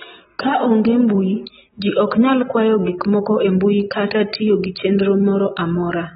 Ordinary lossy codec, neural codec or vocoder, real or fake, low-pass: AAC, 16 kbps; none; real; 19.8 kHz